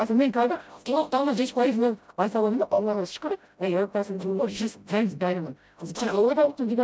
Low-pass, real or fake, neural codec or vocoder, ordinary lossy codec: none; fake; codec, 16 kHz, 0.5 kbps, FreqCodec, smaller model; none